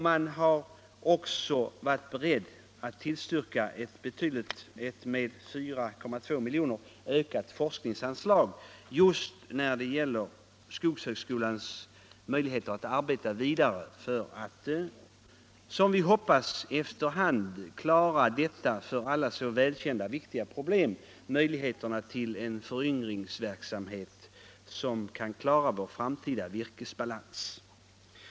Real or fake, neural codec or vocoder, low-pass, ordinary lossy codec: real; none; none; none